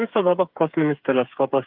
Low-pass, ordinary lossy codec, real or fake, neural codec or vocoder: 5.4 kHz; Opus, 24 kbps; fake; codec, 16 kHz, 8 kbps, FreqCodec, smaller model